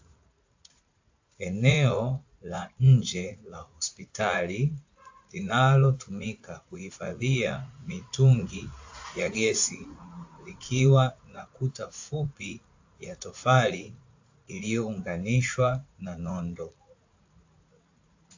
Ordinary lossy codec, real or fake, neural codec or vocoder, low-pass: AAC, 48 kbps; fake; vocoder, 44.1 kHz, 80 mel bands, Vocos; 7.2 kHz